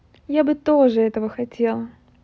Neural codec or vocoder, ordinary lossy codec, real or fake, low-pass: none; none; real; none